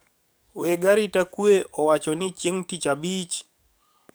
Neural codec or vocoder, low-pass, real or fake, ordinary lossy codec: codec, 44.1 kHz, 7.8 kbps, DAC; none; fake; none